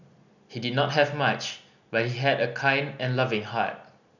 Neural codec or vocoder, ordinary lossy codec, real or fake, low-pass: none; none; real; 7.2 kHz